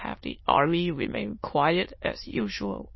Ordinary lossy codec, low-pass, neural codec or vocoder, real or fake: MP3, 24 kbps; 7.2 kHz; autoencoder, 22.05 kHz, a latent of 192 numbers a frame, VITS, trained on many speakers; fake